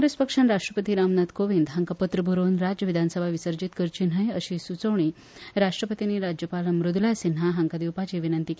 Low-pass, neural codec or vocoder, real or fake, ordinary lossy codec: none; none; real; none